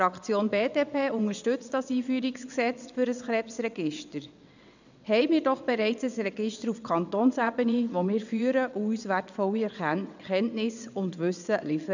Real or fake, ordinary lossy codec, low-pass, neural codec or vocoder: fake; none; 7.2 kHz; vocoder, 22.05 kHz, 80 mel bands, WaveNeXt